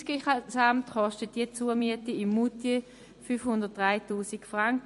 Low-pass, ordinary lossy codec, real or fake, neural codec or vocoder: 14.4 kHz; MP3, 48 kbps; fake; vocoder, 44.1 kHz, 128 mel bands every 256 samples, BigVGAN v2